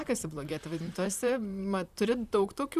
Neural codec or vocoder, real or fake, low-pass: vocoder, 44.1 kHz, 128 mel bands, Pupu-Vocoder; fake; 14.4 kHz